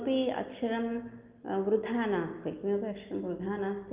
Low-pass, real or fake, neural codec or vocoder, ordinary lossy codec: 3.6 kHz; real; none; Opus, 32 kbps